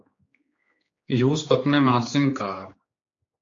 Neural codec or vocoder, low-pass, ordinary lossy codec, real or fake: codec, 16 kHz, 4 kbps, X-Codec, HuBERT features, trained on general audio; 7.2 kHz; AAC, 48 kbps; fake